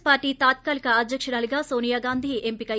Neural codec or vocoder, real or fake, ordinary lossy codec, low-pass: none; real; none; none